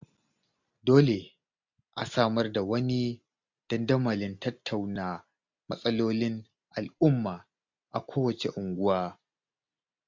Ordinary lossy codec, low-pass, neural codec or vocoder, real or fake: MP3, 64 kbps; 7.2 kHz; none; real